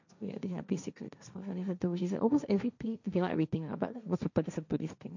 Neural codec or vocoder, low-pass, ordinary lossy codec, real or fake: codec, 16 kHz, 1.1 kbps, Voila-Tokenizer; 7.2 kHz; none; fake